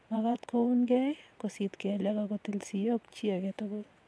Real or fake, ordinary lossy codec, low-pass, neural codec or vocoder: fake; none; none; vocoder, 22.05 kHz, 80 mel bands, WaveNeXt